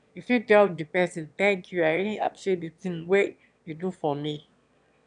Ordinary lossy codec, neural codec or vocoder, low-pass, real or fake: none; autoencoder, 22.05 kHz, a latent of 192 numbers a frame, VITS, trained on one speaker; 9.9 kHz; fake